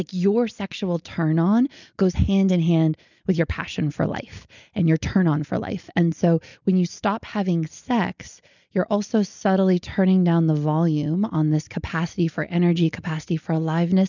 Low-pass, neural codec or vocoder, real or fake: 7.2 kHz; none; real